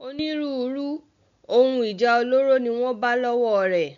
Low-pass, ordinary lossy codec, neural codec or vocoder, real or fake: 7.2 kHz; MP3, 64 kbps; none; real